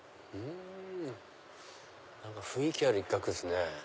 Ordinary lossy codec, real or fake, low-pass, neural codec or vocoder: none; real; none; none